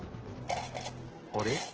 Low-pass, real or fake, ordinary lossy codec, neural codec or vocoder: 7.2 kHz; real; Opus, 16 kbps; none